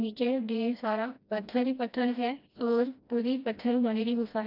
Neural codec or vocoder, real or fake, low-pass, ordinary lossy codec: codec, 16 kHz, 1 kbps, FreqCodec, smaller model; fake; 5.4 kHz; none